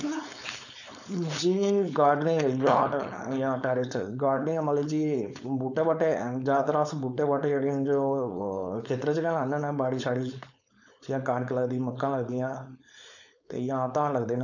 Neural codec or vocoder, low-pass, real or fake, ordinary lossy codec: codec, 16 kHz, 4.8 kbps, FACodec; 7.2 kHz; fake; none